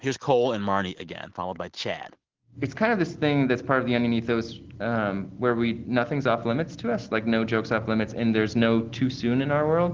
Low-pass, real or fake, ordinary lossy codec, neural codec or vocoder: 7.2 kHz; real; Opus, 16 kbps; none